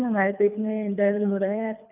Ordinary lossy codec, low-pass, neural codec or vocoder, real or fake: none; 3.6 kHz; codec, 24 kHz, 3 kbps, HILCodec; fake